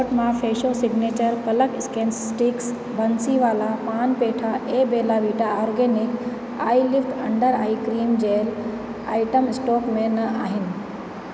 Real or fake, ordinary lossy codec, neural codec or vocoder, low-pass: real; none; none; none